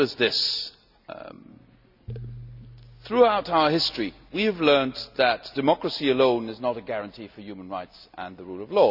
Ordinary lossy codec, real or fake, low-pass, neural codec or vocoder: none; real; 5.4 kHz; none